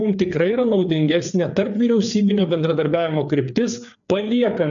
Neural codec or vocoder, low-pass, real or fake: codec, 16 kHz, 4 kbps, FreqCodec, larger model; 7.2 kHz; fake